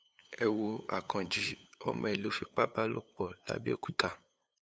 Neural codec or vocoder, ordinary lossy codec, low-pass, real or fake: codec, 16 kHz, 8 kbps, FunCodec, trained on LibriTTS, 25 frames a second; none; none; fake